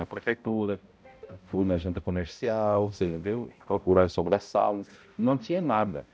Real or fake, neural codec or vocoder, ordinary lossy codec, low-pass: fake; codec, 16 kHz, 0.5 kbps, X-Codec, HuBERT features, trained on balanced general audio; none; none